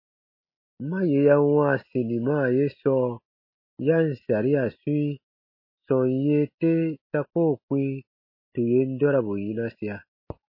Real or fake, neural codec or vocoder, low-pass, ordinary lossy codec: real; none; 5.4 kHz; MP3, 24 kbps